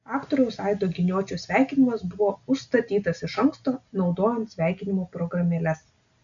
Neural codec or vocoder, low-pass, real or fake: none; 7.2 kHz; real